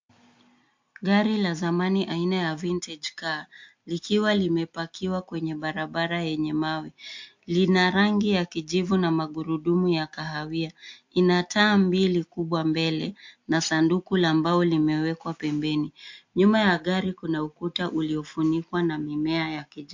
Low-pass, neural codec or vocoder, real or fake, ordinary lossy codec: 7.2 kHz; none; real; MP3, 48 kbps